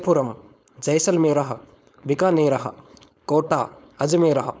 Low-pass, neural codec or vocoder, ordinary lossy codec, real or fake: none; codec, 16 kHz, 4.8 kbps, FACodec; none; fake